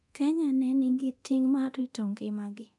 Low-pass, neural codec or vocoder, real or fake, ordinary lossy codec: 10.8 kHz; codec, 24 kHz, 0.9 kbps, DualCodec; fake; none